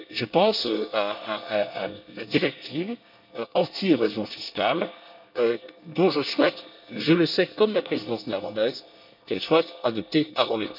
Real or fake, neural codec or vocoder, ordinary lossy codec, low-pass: fake; codec, 24 kHz, 1 kbps, SNAC; none; 5.4 kHz